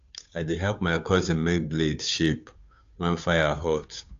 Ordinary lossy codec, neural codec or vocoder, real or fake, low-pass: AAC, 64 kbps; codec, 16 kHz, 2 kbps, FunCodec, trained on Chinese and English, 25 frames a second; fake; 7.2 kHz